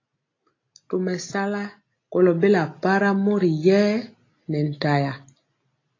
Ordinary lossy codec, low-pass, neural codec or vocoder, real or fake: AAC, 32 kbps; 7.2 kHz; none; real